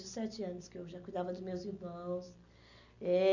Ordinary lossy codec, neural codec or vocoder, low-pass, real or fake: none; none; 7.2 kHz; real